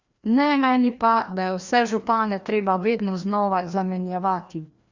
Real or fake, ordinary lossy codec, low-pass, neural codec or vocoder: fake; Opus, 64 kbps; 7.2 kHz; codec, 16 kHz, 1 kbps, FreqCodec, larger model